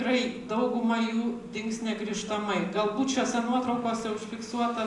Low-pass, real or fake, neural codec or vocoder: 10.8 kHz; real; none